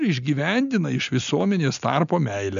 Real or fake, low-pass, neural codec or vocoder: real; 7.2 kHz; none